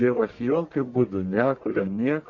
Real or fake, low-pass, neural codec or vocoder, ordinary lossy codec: fake; 7.2 kHz; codec, 44.1 kHz, 1.7 kbps, Pupu-Codec; Opus, 64 kbps